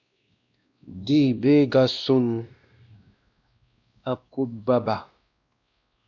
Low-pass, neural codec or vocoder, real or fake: 7.2 kHz; codec, 16 kHz, 1 kbps, X-Codec, WavLM features, trained on Multilingual LibriSpeech; fake